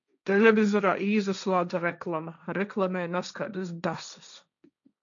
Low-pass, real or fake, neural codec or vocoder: 7.2 kHz; fake; codec, 16 kHz, 1.1 kbps, Voila-Tokenizer